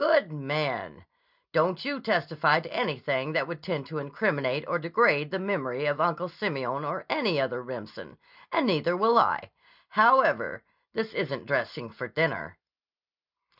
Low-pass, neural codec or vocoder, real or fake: 5.4 kHz; none; real